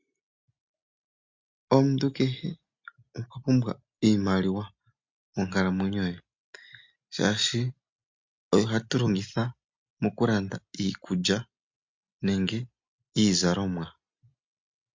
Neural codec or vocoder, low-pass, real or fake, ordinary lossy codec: none; 7.2 kHz; real; MP3, 48 kbps